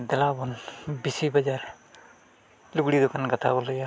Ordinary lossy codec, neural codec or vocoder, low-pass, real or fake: none; none; none; real